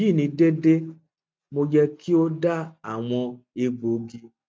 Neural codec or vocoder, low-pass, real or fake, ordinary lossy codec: none; none; real; none